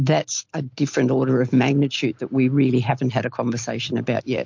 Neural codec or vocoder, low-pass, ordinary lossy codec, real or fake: none; 7.2 kHz; MP3, 48 kbps; real